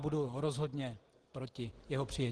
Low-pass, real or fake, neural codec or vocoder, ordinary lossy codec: 10.8 kHz; real; none; Opus, 16 kbps